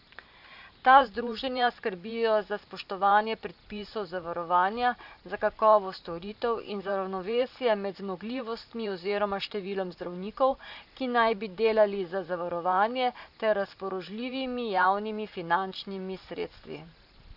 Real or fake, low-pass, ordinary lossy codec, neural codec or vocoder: fake; 5.4 kHz; AAC, 48 kbps; vocoder, 44.1 kHz, 128 mel bands every 512 samples, BigVGAN v2